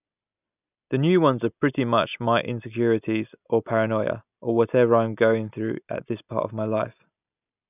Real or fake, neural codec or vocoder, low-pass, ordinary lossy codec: real; none; 3.6 kHz; none